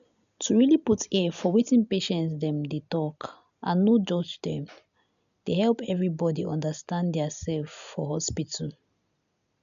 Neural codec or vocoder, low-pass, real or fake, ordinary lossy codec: none; 7.2 kHz; real; none